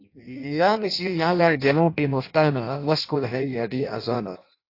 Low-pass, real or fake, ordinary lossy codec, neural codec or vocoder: 5.4 kHz; fake; AAC, 32 kbps; codec, 16 kHz in and 24 kHz out, 0.6 kbps, FireRedTTS-2 codec